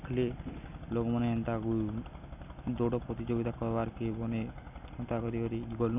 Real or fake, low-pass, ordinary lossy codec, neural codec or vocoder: real; 3.6 kHz; none; none